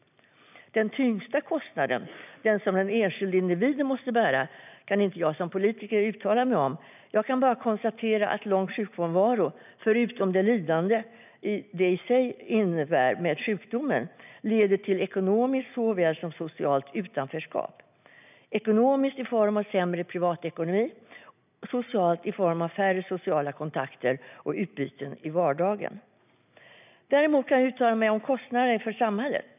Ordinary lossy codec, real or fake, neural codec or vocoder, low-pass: none; real; none; 3.6 kHz